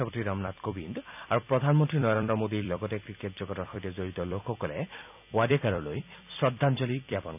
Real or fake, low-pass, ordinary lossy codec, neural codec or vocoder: fake; 3.6 kHz; none; vocoder, 44.1 kHz, 128 mel bands every 256 samples, BigVGAN v2